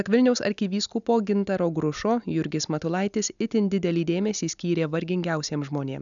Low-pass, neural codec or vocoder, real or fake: 7.2 kHz; none; real